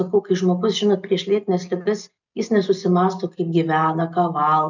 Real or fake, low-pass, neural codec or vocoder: real; 7.2 kHz; none